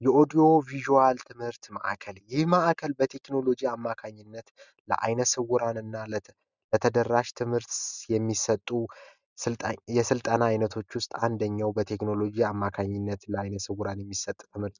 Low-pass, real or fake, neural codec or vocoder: 7.2 kHz; real; none